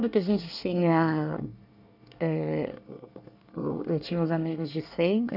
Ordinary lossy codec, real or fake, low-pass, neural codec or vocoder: none; fake; 5.4 kHz; codec, 24 kHz, 1 kbps, SNAC